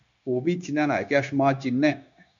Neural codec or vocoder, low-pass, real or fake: codec, 16 kHz, 0.9 kbps, LongCat-Audio-Codec; 7.2 kHz; fake